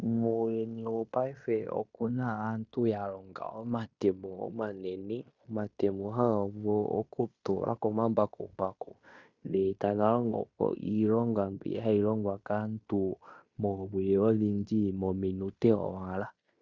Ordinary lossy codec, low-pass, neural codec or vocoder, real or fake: Opus, 64 kbps; 7.2 kHz; codec, 16 kHz in and 24 kHz out, 0.9 kbps, LongCat-Audio-Codec, fine tuned four codebook decoder; fake